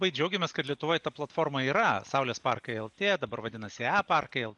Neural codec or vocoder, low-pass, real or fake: none; 10.8 kHz; real